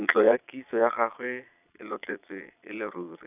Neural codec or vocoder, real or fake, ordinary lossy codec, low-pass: vocoder, 22.05 kHz, 80 mel bands, Vocos; fake; none; 3.6 kHz